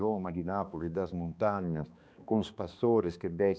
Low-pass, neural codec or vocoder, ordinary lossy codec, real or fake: none; codec, 16 kHz, 2 kbps, X-Codec, HuBERT features, trained on balanced general audio; none; fake